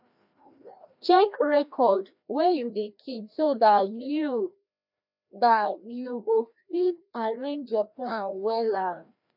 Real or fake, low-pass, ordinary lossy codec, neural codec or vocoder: fake; 5.4 kHz; none; codec, 16 kHz, 1 kbps, FreqCodec, larger model